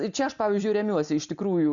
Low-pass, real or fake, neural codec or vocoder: 7.2 kHz; real; none